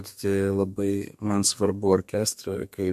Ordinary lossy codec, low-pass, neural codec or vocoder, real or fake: MP3, 64 kbps; 14.4 kHz; codec, 32 kHz, 1.9 kbps, SNAC; fake